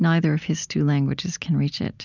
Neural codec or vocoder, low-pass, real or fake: none; 7.2 kHz; real